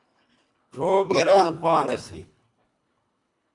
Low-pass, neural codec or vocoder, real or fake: 10.8 kHz; codec, 24 kHz, 1.5 kbps, HILCodec; fake